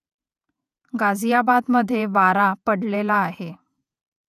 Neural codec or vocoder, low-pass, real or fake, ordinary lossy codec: vocoder, 48 kHz, 128 mel bands, Vocos; 14.4 kHz; fake; none